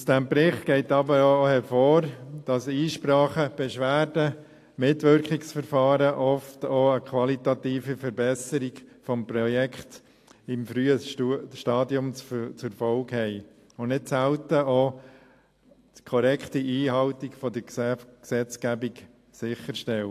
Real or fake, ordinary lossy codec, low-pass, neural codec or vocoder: real; AAC, 64 kbps; 14.4 kHz; none